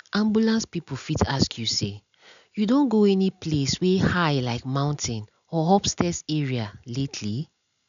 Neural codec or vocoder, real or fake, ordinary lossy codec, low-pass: none; real; none; 7.2 kHz